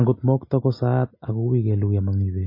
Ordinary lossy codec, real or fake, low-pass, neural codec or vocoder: MP3, 24 kbps; real; 5.4 kHz; none